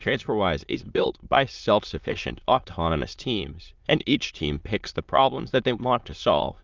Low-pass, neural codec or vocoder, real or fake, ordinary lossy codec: 7.2 kHz; autoencoder, 22.05 kHz, a latent of 192 numbers a frame, VITS, trained on many speakers; fake; Opus, 24 kbps